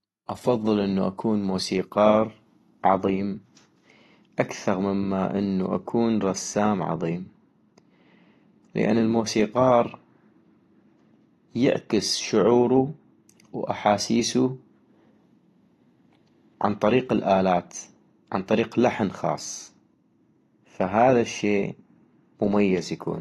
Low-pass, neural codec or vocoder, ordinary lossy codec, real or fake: 19.8 kHz; none; AAC, 32 kbps; real